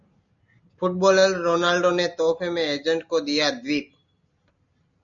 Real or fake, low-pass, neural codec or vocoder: real; 7.2 kHz; none